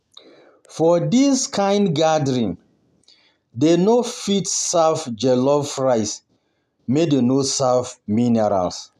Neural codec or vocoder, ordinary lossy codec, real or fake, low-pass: none; none; real; 14.4 kHz